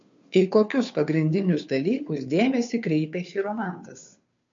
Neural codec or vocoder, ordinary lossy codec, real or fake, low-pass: codec, 16 kHz, 2 kbps, FunCodec, trained on Chinese and English, 25 frames a second; MP3, 48 kbps; fake; 7.2 kHz